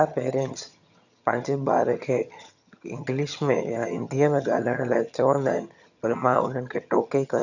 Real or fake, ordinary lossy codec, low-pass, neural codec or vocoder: fake; none; 7.2 kHz; vocoder, 22.05 kHz, 80 mel bands, HiFi-GAN